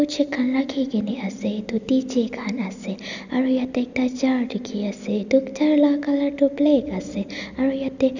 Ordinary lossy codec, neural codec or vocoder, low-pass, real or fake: none; none; 7.2 kHz; real